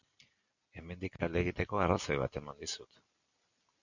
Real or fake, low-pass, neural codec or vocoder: real; 7.2 kHz; none